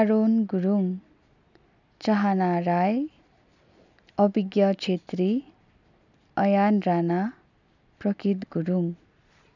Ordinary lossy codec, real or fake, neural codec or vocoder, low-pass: none; real; none; 7.2 kHz